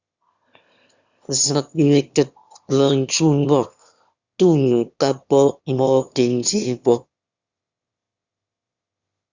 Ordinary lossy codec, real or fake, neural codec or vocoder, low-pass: Opus, 64 kbps; fake; autoencoder, 22.05 kHz, a latent of 192 numbers a frame, VITS, trained on one speaker; 7.2 kHz